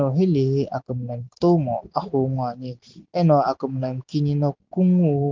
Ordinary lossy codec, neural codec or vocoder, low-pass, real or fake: Opus, 32 kbps; none; 7.2 kHz; real